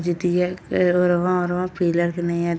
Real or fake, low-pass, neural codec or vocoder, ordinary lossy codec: real; none; none; none